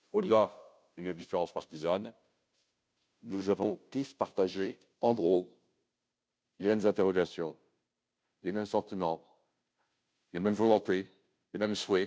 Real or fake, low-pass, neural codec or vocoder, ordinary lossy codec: fake; none; codec, 16 kHz, 0.5 kbps, FunCodec, trained on Chinese and English, 25 frames a second; none